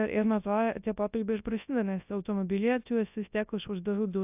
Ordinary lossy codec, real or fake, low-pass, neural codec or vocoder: AAC, 32 kbps; fake; 3.6 kHz; codec, 24 kHz, 0.9 kbps, WavTokenizer, large speech release